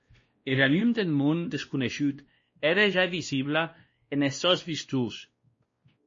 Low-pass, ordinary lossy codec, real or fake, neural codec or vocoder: 7.2 kHz; MP3, 32 kbps; fake; codec, 16 kHz, 1 kbps, X-Codec, WavLM features, trained on Multilingual LibriSpeech